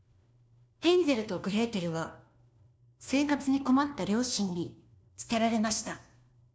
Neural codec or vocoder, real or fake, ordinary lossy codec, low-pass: codec, 16 kHz, 1 kbps, FunCodec, trained on LibriTTS, 50 frames a second; fake; none; none